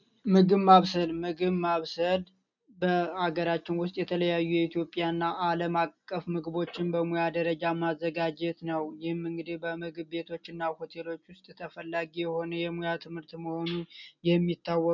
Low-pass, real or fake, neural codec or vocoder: 7.2 kHz; real; none